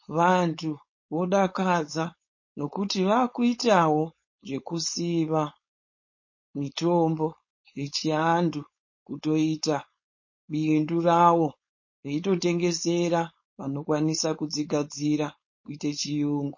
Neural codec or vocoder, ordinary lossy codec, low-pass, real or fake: codec, 16 kHz, 4.8 kbps, FACodec; MP3, 32 kbps; 7.2 kHz; fake